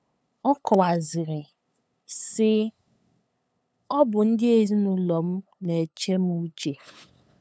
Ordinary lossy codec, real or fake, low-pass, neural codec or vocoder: none; fake; none; codec, 16 kHz, 8 kbps, FunCodec, trained on LibriTTS, 25 frames a second